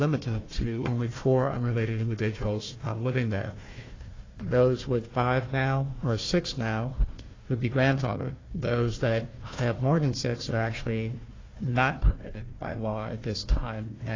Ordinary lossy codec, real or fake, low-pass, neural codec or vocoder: AAC, 32 kbps; fake; 7.2 kHz; codec, 16 kHz, 1 kbps, FunCodec, trained on Chinese and English, 50 frames a second